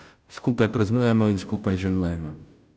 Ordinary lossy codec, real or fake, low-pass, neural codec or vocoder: none; fake; none; codec, 16 kHz, 0.5 kbps, FunCodec, trained on Chinese and English, 25 frames a second